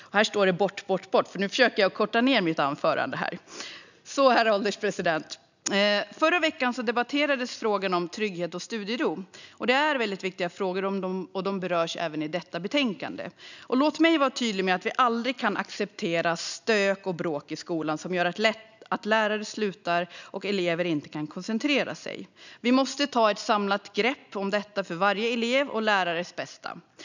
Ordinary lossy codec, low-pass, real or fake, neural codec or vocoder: none; 7.2 kHz; real; none